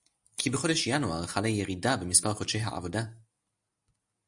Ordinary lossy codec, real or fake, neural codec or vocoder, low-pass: Opus, 64 kbps; real; none; 10.8 kHz